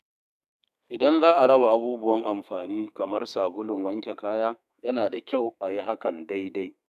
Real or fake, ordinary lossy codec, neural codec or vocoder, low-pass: fake; none; codec, 32 kHz, 1.9 kbps, SNAC; 14.4 kHz